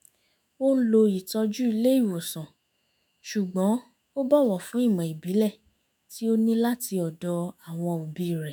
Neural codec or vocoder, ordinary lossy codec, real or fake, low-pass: autoencoder, 48 kHz, 128 numbers a frame, DAC-VAE, trained on Japanese speech; none; fake; none